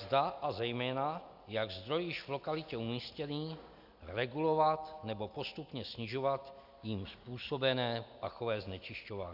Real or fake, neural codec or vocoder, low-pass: real; none; 5.4 kHz